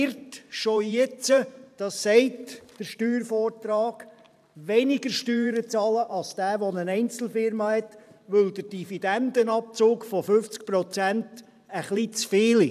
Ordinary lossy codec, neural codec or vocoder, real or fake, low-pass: none; vocoder, 44.1 kHz, 128 mel bands every 512 samples, BigVGAN v2; fake; 14.4 kHz